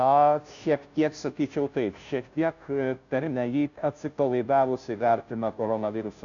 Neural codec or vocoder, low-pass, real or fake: codec, 16 kHz, 0.5 kbps, FunCodec, trained on Chinese and English, 25 frames a second; 7.2 kHz; fake